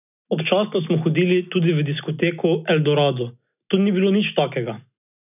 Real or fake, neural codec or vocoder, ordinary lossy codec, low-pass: real; none; none; 3.6 kHz